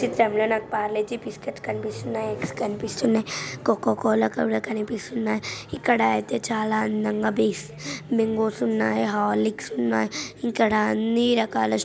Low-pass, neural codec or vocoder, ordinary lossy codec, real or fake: none; none; none; real